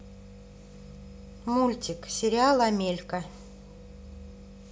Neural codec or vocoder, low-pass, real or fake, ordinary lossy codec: none; none; real; none